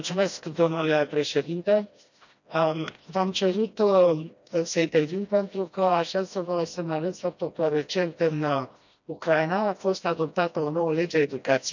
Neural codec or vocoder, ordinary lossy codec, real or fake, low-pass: codec, 16 kHz, 1 kbps, FreqCodec, smaller model; none; fake; 7.2 kHz